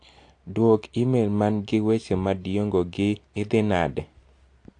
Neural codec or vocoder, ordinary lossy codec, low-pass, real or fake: none; AAC, 48 kbps; 9.9 kHz; real